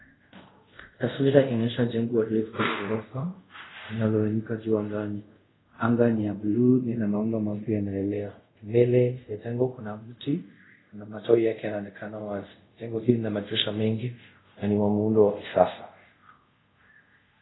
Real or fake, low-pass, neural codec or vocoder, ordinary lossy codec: fake; 7.2 kHz; codec, 24 kHz, 0.5 kbps, DualCodec; AAC, 16 kbps